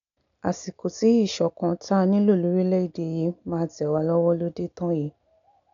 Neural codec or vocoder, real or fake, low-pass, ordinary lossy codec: none; real; 7.2 kHz; none